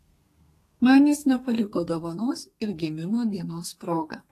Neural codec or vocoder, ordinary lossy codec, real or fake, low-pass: codec, 32 kHz, 1.9 kbps, SNAC; AAC, 48 kbps; fake; 14.4 kHz